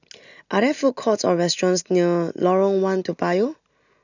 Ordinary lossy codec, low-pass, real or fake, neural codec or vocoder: none; 7.2 kHz; real; none